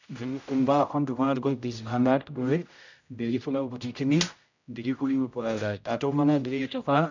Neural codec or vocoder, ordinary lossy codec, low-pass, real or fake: codec, 16 kHz, 0.5 kbps, X-Codec, HuBERT features, trained on general audio; none; 7.2 kHz; fake